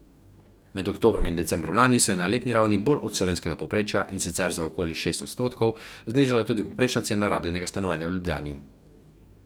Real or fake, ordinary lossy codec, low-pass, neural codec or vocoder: fake; none; none; codec, 44.1 kHz, 2.6 kbps, DAC